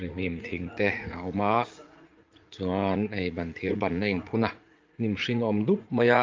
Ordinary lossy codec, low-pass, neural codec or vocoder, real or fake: Opus, 32 kbps; 7.2 kHz; vocoder, 44.1 kHz, 80 mel bands, Vocos; fake